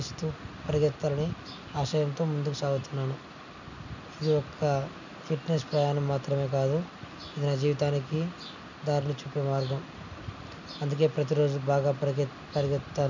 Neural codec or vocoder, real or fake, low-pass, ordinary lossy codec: none; real; 7.2 kHz; none